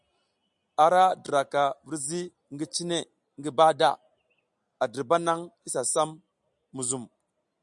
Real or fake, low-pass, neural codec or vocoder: real; 10.8 kHz; none